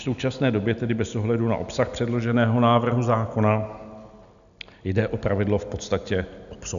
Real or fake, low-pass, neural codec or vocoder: real; 7.2 kHz; none